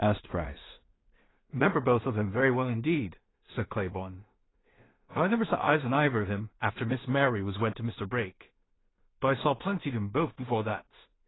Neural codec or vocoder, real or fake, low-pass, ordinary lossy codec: codec, 16 kHz in and 24 kHz out, 0.4 kbps, LongCat-Audio-Codec, two codebook decoder; fake; 7.2 kHz; AAC, 16 kbps